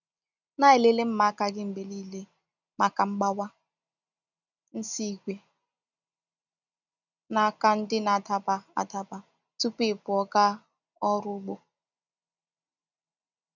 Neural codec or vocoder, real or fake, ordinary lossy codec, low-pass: none; real; none; 7.2 kHz